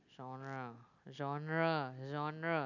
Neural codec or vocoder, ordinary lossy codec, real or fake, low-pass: none; none; real; 7.2 kHz